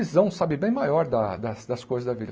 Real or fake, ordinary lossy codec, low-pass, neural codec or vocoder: real; none; none; none